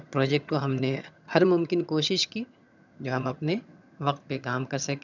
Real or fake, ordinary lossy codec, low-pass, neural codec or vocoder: fake; none; 7.2 kHz; vocoder, 22.05 kHz, 80 mel bands, HiFi-GAN